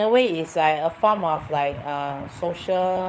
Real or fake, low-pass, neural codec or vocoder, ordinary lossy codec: fake; none; codec, 16 kHz, 16 kbps, FunCodec, trained on LibriTTS, 50 frames a second; none